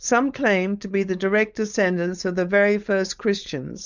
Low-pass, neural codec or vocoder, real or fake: 7.2 kHz; codec, 16 kHz, 4.8 kbps, FACodec; fake